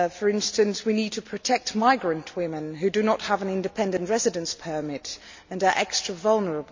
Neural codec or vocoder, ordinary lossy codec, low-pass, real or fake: none; MP3, 64 kbps; 7.2 kHz; real